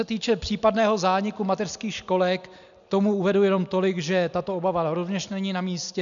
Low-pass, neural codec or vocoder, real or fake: 7.2 kHz; none; real